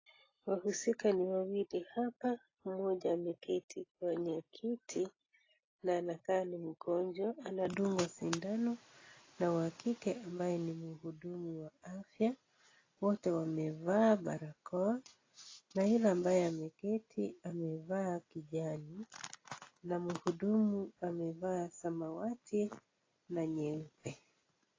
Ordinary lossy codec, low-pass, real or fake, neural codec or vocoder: AAC, 32 kbps; 7.2 kHz; real; none